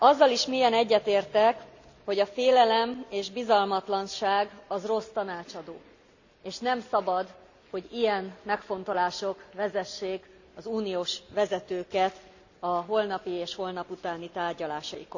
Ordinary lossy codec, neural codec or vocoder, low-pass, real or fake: none; none; 7.2 kHz; real